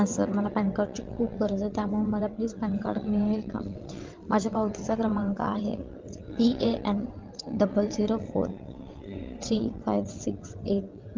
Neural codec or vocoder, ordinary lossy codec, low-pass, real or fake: vocoder, 22.05 kHz, 80 mel bands, WaveNeXt; Opus, 32 kbps; 7.2 kHz; fake